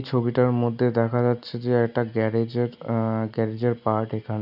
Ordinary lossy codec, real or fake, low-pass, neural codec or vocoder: none; real; 5.4 kHz; none